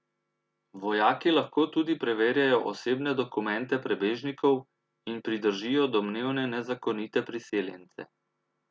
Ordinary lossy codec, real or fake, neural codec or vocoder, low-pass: none; real; none; none